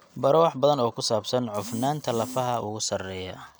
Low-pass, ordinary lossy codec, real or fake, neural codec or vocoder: none; none; real; none